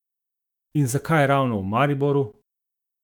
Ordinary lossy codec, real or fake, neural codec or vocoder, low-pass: none; fake; autoencoder, 48 kHz, 128 numbers a frame, DAC-VAE, trained on Japanese speech; 19.8 kHz